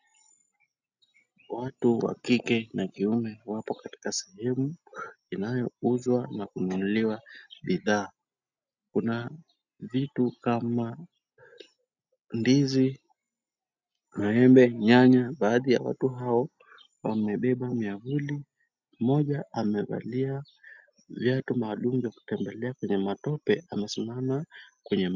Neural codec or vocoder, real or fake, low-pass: none; real; 7.2 kHz